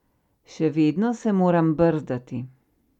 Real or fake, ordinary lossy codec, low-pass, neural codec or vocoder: real; none; 19.8 kHz; none